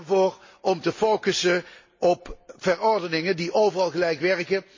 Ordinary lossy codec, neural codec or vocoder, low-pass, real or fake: MP3, 32 kbps; none; 7.2 kHz; real